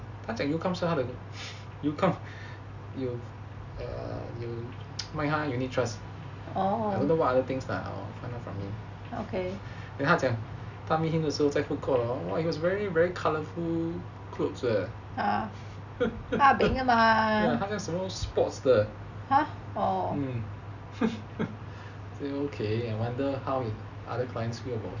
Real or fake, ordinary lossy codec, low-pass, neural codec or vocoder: real; none; 7.2 kHz; none